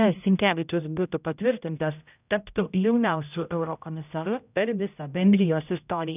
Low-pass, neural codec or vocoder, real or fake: 3.6 kHz; codec, 16 kHz, 0.5 kbps, X-Codec, HuBERT features, trained on general audio; fake